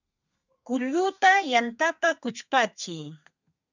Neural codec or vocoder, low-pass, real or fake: codec, 32 kHz, 1.9 kbps, SNAC; 7.2 kHz; fake